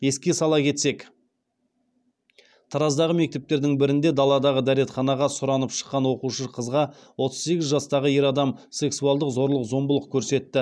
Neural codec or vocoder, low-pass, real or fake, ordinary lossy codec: none; none; real; none